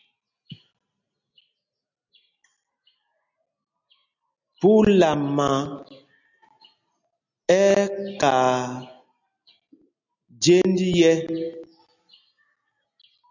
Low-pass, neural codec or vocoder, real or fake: 7.2 kHz; none; real